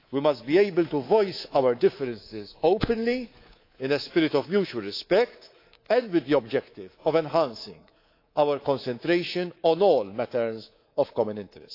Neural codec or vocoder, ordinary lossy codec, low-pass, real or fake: codec, 24 kHz, 3.1 kbps, DualCodec; AAC, 32 kbps; 5.4 kHz; fake